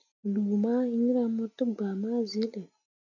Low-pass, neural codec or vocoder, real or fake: 7.2 kHz; none; real